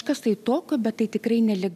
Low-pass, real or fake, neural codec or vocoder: 14.4 kHz; real; none